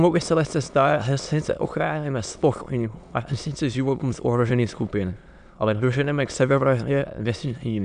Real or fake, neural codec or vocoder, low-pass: fake; autoencoder, 22.05 kHz, a latent of 192 numbers a frame, VITS, trained on many speakers; 9.9 kHz